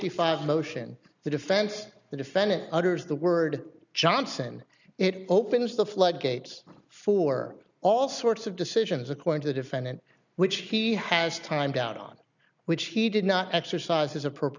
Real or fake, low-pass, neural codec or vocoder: real; 7.2 kHz; none